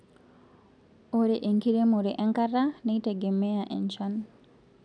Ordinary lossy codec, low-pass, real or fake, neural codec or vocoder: none; 9.9 kHz; real; none